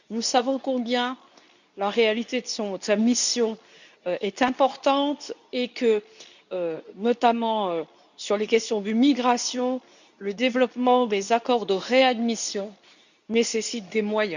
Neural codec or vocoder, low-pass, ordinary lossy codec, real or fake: codec, 24 kHz, 0.9 kbps, WavTokenizer, medium speech release version 2; 7.2 kHz; none; fake